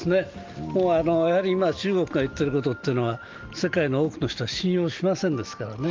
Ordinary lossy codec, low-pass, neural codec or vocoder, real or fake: Opus, 24 kbps; 7.2 kHz; none; real